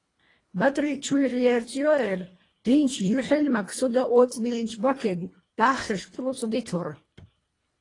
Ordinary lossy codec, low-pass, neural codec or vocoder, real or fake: AAC, 32 kbps; 10.8 kHz; codec, 24 kHz, 1.5 kbps, HILCodec; fake